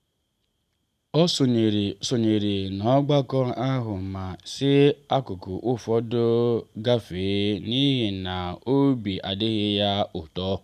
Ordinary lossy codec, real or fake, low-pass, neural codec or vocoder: none; real; 14.4 kHz; none